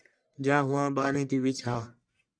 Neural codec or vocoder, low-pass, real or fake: codec, 44.1 kHz, 1.7 kbps, Pupu-Codec; 9.9 kHz; fake